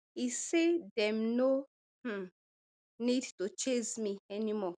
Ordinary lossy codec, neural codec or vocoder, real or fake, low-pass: none; none; real; 9.9 kHz